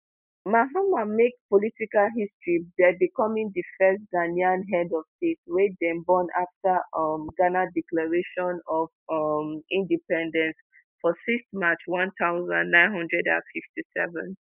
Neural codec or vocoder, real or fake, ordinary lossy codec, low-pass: none; real; none; 3.6 kHz